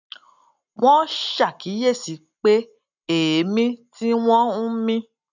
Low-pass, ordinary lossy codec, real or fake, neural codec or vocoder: 7.2 kHz; none; real; none